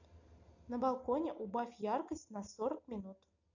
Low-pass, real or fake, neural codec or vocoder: 7.2 kHz; real; none